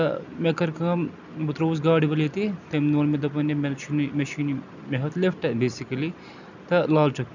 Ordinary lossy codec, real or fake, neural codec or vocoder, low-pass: none; real; none; 7.2 kHz